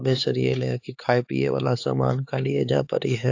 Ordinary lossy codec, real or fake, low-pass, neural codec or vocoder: AAC, 48 kbps; fake; 7.2 kHz; codec, 16 kHz, 4 kbps, X-Codec, HuBERT features, trained on LibriSpeech